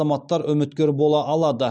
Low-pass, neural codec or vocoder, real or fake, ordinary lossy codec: none; none; real; none